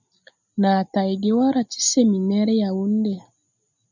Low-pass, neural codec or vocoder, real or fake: 7.2 kHz; none; real